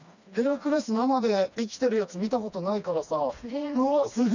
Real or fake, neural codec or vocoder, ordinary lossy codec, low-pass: fake; codec, 16 kHz, 2 kbps, FreqCodec, smaller model; none; 7.2 kHz